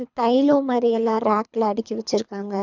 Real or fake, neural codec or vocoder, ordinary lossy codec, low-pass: fake; codec, 24 kHz, 3 kbps, HILCodec; none; 7.2 kHz